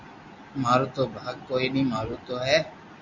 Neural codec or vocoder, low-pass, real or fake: none; 7.2 kHz; real